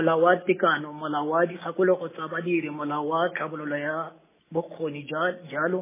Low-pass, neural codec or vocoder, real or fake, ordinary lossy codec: 3.6 kHz; none; real; MP3, 16 kbps